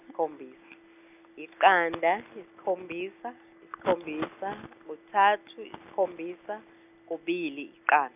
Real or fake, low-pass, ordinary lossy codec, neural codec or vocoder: real; 3.6 kHz; none; none